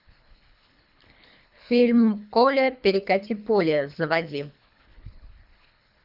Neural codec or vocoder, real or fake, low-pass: codec, 24 kHz, 3 kbps, HILCodec; fake; 5.4 kHz